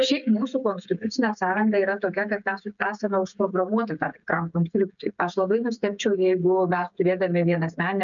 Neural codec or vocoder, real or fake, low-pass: codec, 16 kHz, 16 kbps, FreqCodec, smaller model; fake; 7.2 kHz